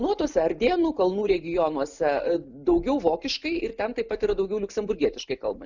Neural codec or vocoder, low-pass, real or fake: none; 7.2 kHz; real